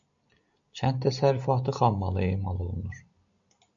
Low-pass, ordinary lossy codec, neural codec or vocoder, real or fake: 7.2 kHz; AAC, 64 kbps; none; real